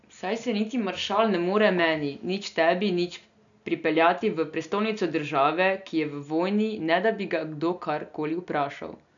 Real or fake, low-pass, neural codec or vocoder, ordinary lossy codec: real; 7.2 kHz; none; none